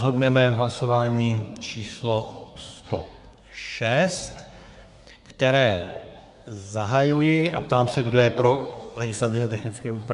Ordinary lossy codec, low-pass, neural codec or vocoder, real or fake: AAC, 96 kbps; 10.8 kHz; codec, 24 kHz, 1 kbps, SNAC; fake